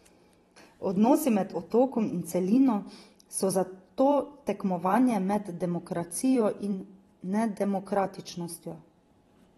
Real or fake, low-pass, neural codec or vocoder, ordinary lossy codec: fake; 19.8 kHz; vocoder, 44.1 kHz, 128 mel bands every 512 samples, BigVGAN v2; AAC, 32 kbps